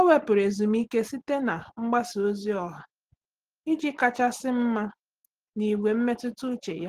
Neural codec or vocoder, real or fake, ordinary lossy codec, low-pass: none; real; Opus, 16 kbps; 14.4 kHz